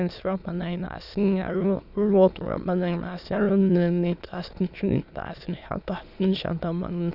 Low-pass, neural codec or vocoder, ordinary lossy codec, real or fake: 5.4 kHz; autoencoder, 22.05 kHz, a latent of 192 numbers a frame, VITS, trained on many speakers; none; fake